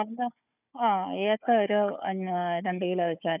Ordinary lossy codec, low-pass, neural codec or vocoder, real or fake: none; 3.6 kHz; codec, 16 kHz, 4 kbps, FunCodec, trained on Chinese and English, 50 frames a second; fake